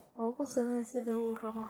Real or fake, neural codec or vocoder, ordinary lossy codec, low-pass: fake; codec, 44.1 kHz, 1.7 kbps, Pupu-Codec; none; none